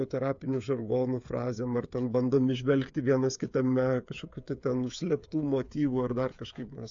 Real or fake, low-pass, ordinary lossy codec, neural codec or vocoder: fake; 7.2 kHz; AAC, 64 kbps; codec, 16 kHz, 8 kbps, FreqCodec, smaller model